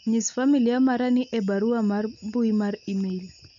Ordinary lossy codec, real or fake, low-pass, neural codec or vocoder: none; real; 7.2 kHz; none